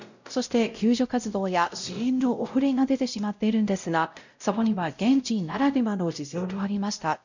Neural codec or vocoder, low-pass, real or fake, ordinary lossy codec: codec, 16 kHz, 0.5 kbps, X-Codec, WavLM features, trained on Multilingual LibriSpeech; 7.2 kHz; fake; none